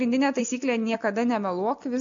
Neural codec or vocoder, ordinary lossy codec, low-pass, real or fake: none; MP3, 64 kbps; 7.2 kHz; real